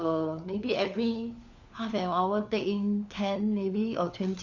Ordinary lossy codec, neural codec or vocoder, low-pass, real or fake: none; codec, 16 kHz, 4 kbps, FunCodec, trained on LibriTTS, 50 frames a second; 7.2 kHz; fake